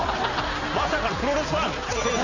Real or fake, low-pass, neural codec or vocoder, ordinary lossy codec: real; 7.2 kHz; none; none